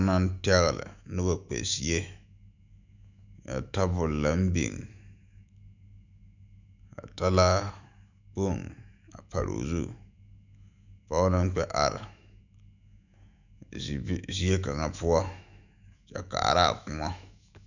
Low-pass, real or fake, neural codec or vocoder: 7.2 kHz; fake; autoencoder, 48 kHz, 128 numbers a frame, DAC-VAE, trained on Japanese speech